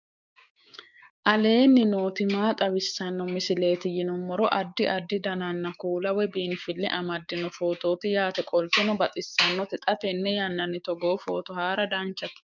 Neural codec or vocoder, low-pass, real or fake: codec, 16 kHz, 6 kbps, DAC; 7.2 kHz; fake